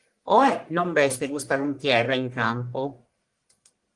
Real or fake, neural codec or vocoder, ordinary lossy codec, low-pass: fake; codec, 44.1 kHz, 1.7 kbps, Pupu-Codec; Opus, 24 kbps; 10.8 kHz